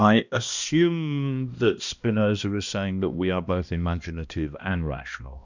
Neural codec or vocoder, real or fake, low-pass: codec, 16 kHz, 1 kbps, X-Codec, HuBERT features, trained on balanced general audio; fake; 7.2 kHz